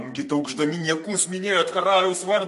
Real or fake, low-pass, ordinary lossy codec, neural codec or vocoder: fake; 14.4 kHz; MP3, 48 kbps; codec, 32 kHz, 1.9 kbps, SNAC